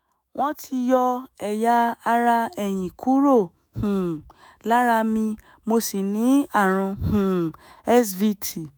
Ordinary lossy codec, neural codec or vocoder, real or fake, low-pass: none; autoencoder, 48 kHz, 128 numbers a frame, DAC-VAE, trained on Japanese speech; fake; none